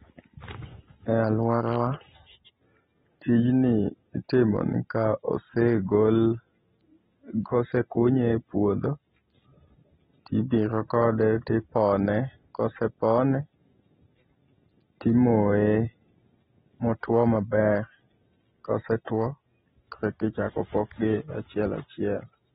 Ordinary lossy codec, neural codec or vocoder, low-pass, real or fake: AAC, 16 kbps; none; 19.8 kHz; real